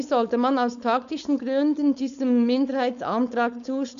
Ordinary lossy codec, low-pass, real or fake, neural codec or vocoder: none; 7.2 kHz; fake; codec, 16 kHz, 4.8 kbps, FACodec